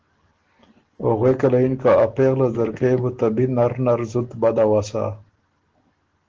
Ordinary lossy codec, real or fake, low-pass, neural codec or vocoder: Opus, 16 kbps; real; 7.2 kHz; none